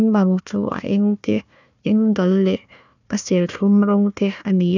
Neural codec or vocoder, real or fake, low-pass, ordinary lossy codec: codec, 16 kHz, 1 kbps, FunCodec, trained on Chinese and English, 50 frames a second; fake; 7.2 kHz; none